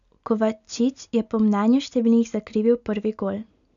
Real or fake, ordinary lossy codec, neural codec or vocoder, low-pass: real; none; none; 7.2 kHz